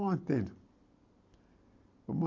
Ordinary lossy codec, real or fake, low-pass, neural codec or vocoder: none; real; 7.2 kHz; none